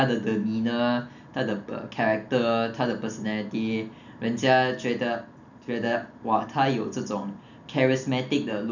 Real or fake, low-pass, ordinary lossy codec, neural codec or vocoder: real; 7.2 kHz; none; none